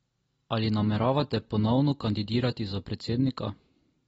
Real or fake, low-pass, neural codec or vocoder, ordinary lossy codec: real; 19.8 kHz; none; AAC, 24 kbps